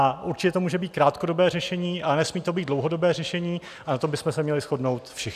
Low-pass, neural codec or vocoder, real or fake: 14.4 kHz; none; real